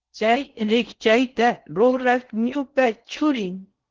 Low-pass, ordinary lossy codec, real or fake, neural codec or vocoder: 7.2 kHz; Opus, 16 kbps; fake; codec, 16 kHz in and 24 kHz out, 0.6 kbps, FocalCodec, streaming, 4096 codes